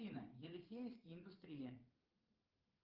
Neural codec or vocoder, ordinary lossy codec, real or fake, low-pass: vocoder, 22.05 kHz, 80 mel bands, Vocos; Opus, 32 kbps; fake; 5.4 kHz